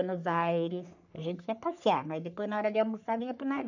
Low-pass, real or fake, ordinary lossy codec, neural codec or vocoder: 7.2 kHz; fake; none; codec, 44.1 kHz, 3.4 kbps, Pupu-Codec